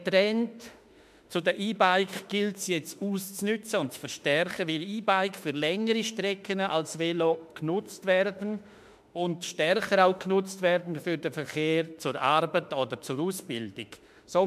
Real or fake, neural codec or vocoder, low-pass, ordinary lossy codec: fake; autoencoder, 48 kHz, 32 numbers a frame, DAC-VAE, trained on Japanese speech; 14.4 kHz; MP3, 96 kbps